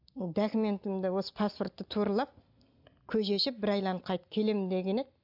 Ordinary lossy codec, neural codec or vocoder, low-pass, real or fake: AAC, 48 kbps; none; 5.4 kHz; real